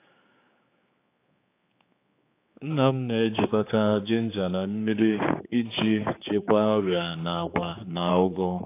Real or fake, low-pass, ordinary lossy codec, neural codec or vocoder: fake; 3.6 kHz; AAC, 24 kbps; codec, 16 kHz, 4 kbps, X-Codec, HuBERT features, trained on general audio